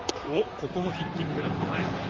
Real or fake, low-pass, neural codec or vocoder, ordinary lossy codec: fake; 7.2 kHz; vocoder, 44.1 kHz, 128 mel bands, Pupu-Vocoder; Opus, 32 kbps